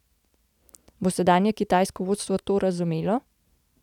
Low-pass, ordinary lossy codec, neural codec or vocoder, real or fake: 19.8 kHz; none; none; real